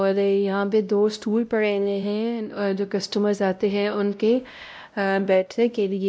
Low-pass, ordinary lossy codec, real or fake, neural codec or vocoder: none; none; fake; codec, 16 kHz, 0.5 kbps, X-Codec, WavLM features, trained on Multilingual LibriSpeech